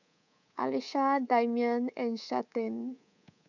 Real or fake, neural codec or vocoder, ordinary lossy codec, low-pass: fake; codec, 24 kHz, 3.1 kbps, DualCodec; none; 7.2 kHz